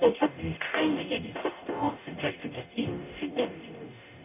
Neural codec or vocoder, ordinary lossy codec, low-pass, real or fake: codec, 44.1 kHz, 0.9 kbps, DAC; none; 3.6 kHz; fake